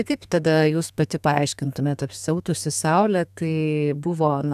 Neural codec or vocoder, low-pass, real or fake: codec, 32 kHz, 1.9 kbps, SNAC; 14.4 kHz; fake